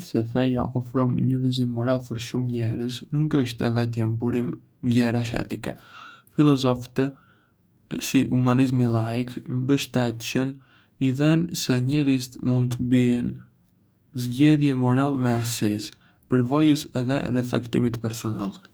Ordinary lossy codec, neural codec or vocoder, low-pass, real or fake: none; codec, 44.1 kHz, 2.6 kbps, DAC; none; fake